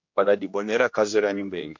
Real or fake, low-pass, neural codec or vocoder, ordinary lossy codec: fake; 7.2 kHz; codec, 16 kHz, 1 kbps, X-Codec, HuBERT features, trained on general audio; MP3, 48 kbps